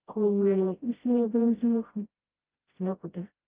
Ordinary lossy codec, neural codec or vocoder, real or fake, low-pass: Opus, 24 kbps; codec, 16 kHz, 0.5 kbps, FreqCodec, smaller model; fake; 3.6 kHz